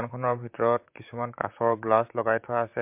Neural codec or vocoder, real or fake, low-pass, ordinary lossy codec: vocoder, 44.1 kHz, 128 mel bands, Pupu-Vocoder; fake; 3.6 kHz; MP3, 32 kbps